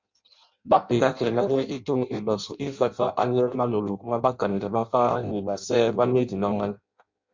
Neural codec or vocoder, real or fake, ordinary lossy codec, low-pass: codec, 16 kHz in and 24 kHz out, 0.6 kbps, FireRedTTS-2 codec; fake; MP3, 64 kbps; 7.2 kHz